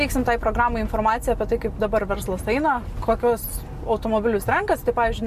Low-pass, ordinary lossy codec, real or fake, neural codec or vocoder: 14.4 kHz; MP3, 64 kbps; real; none